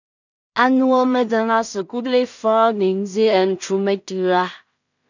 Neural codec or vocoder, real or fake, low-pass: codec, 16 kHz in and 24 kHz out, 0.4 kbps, LongCat-Audio-Codec, two codebook decoder; fake; 7.2 kHz